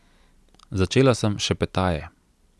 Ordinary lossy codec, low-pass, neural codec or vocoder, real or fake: none; none; none; real